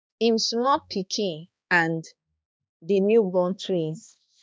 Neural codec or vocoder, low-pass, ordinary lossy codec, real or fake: codec, 16 kHz, 2 kbps, X-Codec, HuBERT features, trained on balanced general audio; none; none; fake